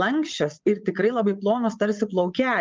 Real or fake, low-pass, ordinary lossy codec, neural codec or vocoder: fake; 7.2 kHz; Opus, 24 kbps; vocoder, 22.05 kHz, 80 mel bands, WaveNeXt